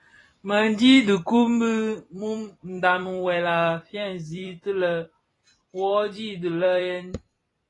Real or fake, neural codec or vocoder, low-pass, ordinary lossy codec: fake; vocoder, 44.1 kHz, 128 mel bands every 256 samples, BigVGAN v2; 10.8 kHz; AAC, 32 kbps